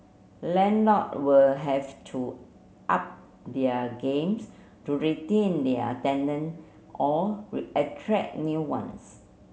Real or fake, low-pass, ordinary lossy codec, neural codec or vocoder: real; none; none; none